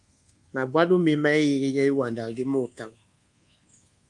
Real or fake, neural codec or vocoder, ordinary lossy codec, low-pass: fake; codec, 24 kHz, 1.2 kbps, DualCodec; Opus, 32 kbps; 10.8 kHz